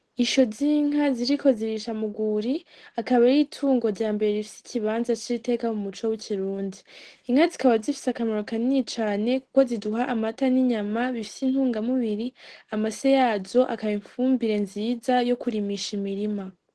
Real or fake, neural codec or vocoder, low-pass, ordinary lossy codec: real; none; 10.8 kHz; Opus, 16 kbps